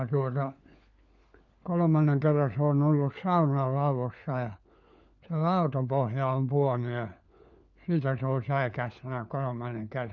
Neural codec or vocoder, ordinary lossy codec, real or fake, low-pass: codec, 16 kHz, 4 kbps, FunCodec, trained on LibriTTS, 50 frames a second; none; fake; 7.2 kHz